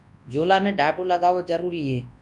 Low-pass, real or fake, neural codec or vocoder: 10.8 kHz; fake; codec, 24 kHz, 0.9 kbps, WavTokenizer, large speech release